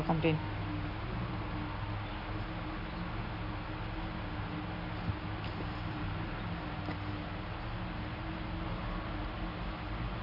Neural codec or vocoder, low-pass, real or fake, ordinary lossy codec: none; 5.4 kHz; real; none